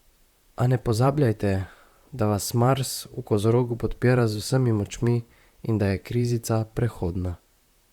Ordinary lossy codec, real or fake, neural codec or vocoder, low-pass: Opus, 64 kbps; fake; vocoder, 44.1 kHz, 128 mel bands, Pupu-Vocoder; 19.8 kHz